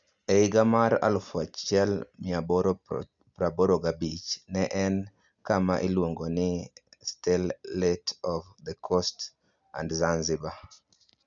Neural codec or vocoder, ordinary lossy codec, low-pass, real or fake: none; none; 7.2 kHz; real